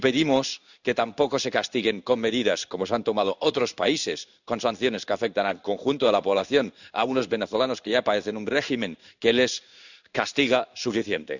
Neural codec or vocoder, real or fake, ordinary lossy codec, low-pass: codec, 16 kHz in and 24 kHz out, 1 kbps, XY-Tokenizer; fake; none; 7.2 kHz